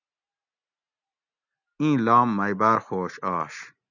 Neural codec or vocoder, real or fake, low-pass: none; real; 7.2 kHz